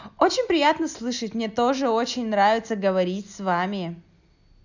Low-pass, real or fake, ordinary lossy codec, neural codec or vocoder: 7.2 kHz; real; none; none